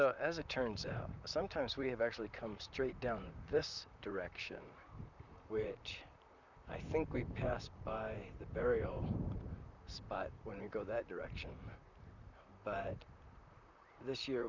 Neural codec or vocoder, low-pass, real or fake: vocoder, 44.1 kHz, 128 mel bands, Pupu-Vocoder; 7.2 kHz; fake